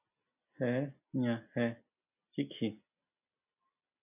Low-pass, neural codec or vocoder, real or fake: 3.6 kHz; none; real